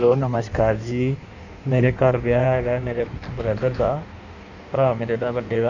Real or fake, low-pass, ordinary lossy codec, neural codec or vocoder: fake; 7.2 kHz; none; codec, 16 kHz in and 24 kHz out, 1.1 kbps, FireRedTTS-2 codec